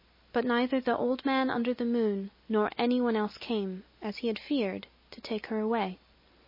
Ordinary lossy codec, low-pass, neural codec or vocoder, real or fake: MP3, 24 kbps; 5.4 kHz; none; real